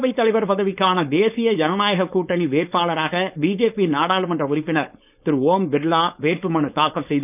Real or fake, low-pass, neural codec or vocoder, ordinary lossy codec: fake; 3.6 kHz; codec, 16 kHz, 4.8 kbps, FACodec; none